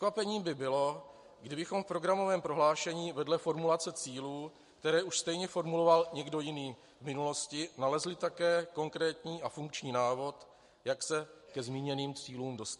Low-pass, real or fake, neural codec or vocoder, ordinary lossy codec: 14.4 kHz; real; none; MP3, 48 kbps